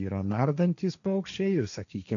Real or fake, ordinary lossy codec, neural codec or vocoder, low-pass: fake; MP3, 96 kbps; codec, 16 kHz, 1.1 kbps, Voila-Tokenizer; 7.2 kHz